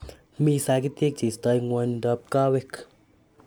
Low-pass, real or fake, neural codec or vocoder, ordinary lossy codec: none; real; none; none